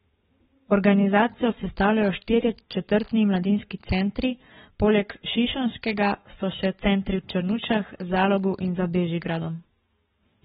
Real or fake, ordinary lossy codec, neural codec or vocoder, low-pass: fake; AAC, 16 kbps; codec, 44.1 kHz, 7.8 kbps, Pupu-Codec; 19.8 kHz